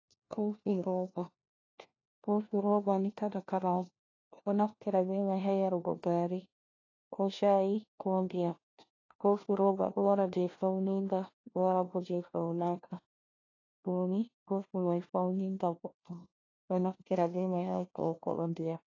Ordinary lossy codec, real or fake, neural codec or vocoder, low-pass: AAC, 32 kbps; fake; codec, 16 kHz, 1 kbps, FunCodec, trained on LibriTTS, 50 frames a second; 7.2 kHz